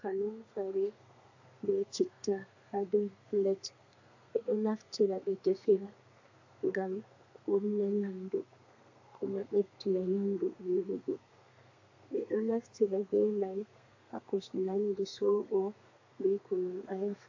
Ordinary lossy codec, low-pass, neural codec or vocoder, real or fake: AAC, 48 kbps; 7.2 kHz; codec, 32 kHz, 1.9 kbps, SNAC; fake